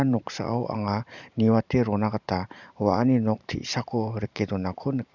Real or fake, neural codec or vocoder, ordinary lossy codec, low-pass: fake; vocoder, 44.1 kHz, 128 mel bands every 512 samples, BigVGAN v2; none; 7.2 kHz